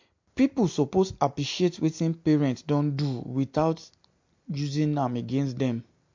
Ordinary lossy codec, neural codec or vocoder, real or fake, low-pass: MP3, 48 kbps; none; real; 7.2 kHz